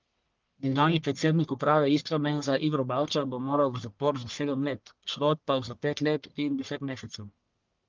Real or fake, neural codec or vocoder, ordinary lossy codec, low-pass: fake; codec, 44.1 kHz, 1.7 kbps, Pupu-Codec; Opus, 24 kbps; 7.2 kHz